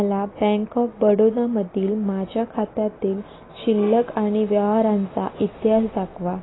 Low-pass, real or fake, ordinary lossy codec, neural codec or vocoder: 7.2 kHz; real; AAC, 16 kbps; none